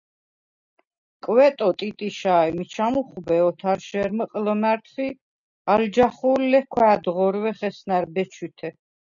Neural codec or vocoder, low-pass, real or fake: none; 7.2 kHz; real